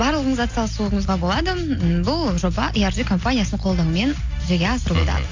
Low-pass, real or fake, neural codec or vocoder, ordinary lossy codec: 7.2 kHz; real; none; AAC, 48 kbps